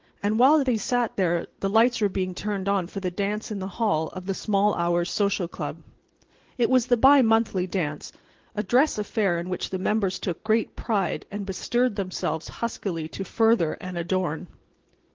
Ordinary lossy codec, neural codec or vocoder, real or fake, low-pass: Opus, 16 kbps; none; real; 7.2 kHz